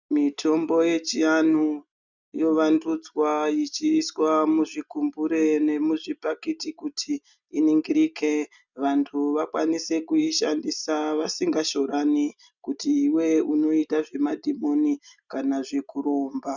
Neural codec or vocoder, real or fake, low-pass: none; real; 7.2 kHz